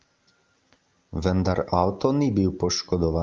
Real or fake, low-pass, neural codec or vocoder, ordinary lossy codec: real; 7.2 kHz; none; Opus, 24 kbps